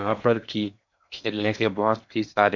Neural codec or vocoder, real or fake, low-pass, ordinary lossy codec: codec, 16 kHz in and 24 kHz out, 0.8 kbps, FocalCodec, streaming, 65536 codes; fake; 7.2 kHz; MP3, 64 kbps